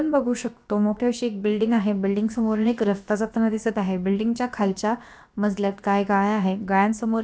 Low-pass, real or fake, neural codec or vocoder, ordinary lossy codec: none; fake; codec, 16 kHz, about 1 kbps, DyCAST, with the encoder's durations; none